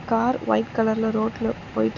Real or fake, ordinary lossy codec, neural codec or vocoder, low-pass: real; none; none; 7.2 kHz